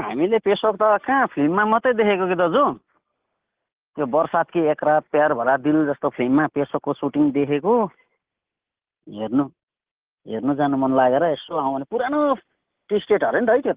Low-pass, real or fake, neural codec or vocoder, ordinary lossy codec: 3.6 kHz; real; none; Opus, 16 kbps